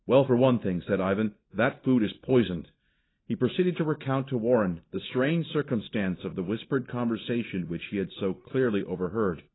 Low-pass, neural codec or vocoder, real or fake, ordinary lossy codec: 7.2 kHz; none; real; AAC, 16 kbps